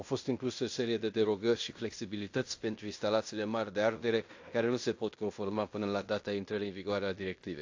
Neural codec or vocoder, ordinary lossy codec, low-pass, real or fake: codec, 16 kHz in and 24 kHz out, 0.9 kbps, LongCat-Audio-Codec, fine tuned four codebook decoder; AAC, 48 kbps; 7.2 kHz; fake